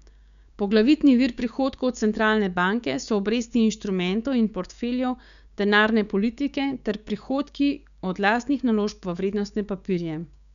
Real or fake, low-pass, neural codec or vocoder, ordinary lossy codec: fake; 7.2 kHz; codec, 16 kHz, 6 kbps, DAC; none